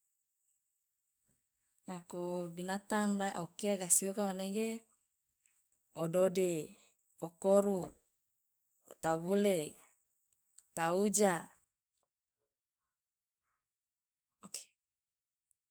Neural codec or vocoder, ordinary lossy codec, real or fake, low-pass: codec, 44.1 kHz, 2.6 kbps, SNAC; none; fake; none